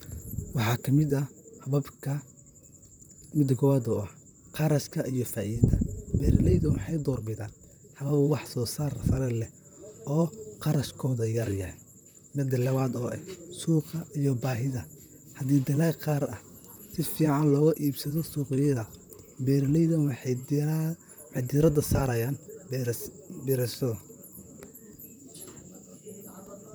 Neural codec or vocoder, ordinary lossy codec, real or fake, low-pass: vocoder, 44.1 kHz, 128 mel bands, Pupu-Vocoder; none; fake; none